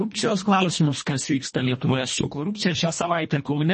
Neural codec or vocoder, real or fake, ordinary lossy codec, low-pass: codec, 24 kHz, 1.5 kbps, HILCodec; fake; MP3, 32 kbps; 10.8 kHz